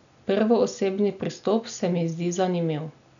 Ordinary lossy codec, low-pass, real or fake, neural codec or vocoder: none; 7.2 kHz; real; none